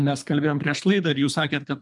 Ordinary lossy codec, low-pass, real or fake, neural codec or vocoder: MP3, 96 kbps; 10.8 kHz; fake; codec, 24 kHz, 3 kbps, HILCodec